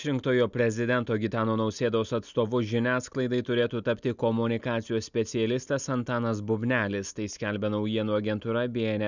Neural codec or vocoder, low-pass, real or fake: none; 7.2 kHz; real